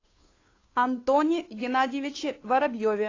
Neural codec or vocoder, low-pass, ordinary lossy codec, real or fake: codec, 16 kHz, 2 kbps, FunCodec, trained on Chinese and English, 25 frames a second; 7.2 kHz; AAC, 32 kbps; fake